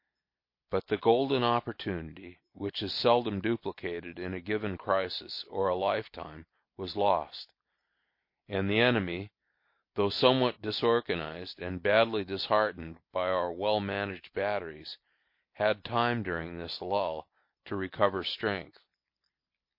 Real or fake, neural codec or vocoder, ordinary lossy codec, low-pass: real; none; MP3, 32 kbps; 5.4 kHz